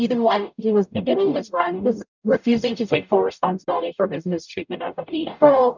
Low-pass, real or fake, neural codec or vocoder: 7.2 kHz; fake; codec, 44.1 kHz, 0.9 kbps, DAC